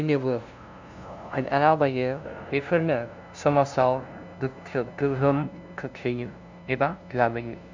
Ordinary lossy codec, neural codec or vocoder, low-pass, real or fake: MP3, 64 kbps; codec, 16 kHz, 0.5 kbps, FunCodec, trained on LibriTTS, 25 frames a second; 7.2 kHz; fake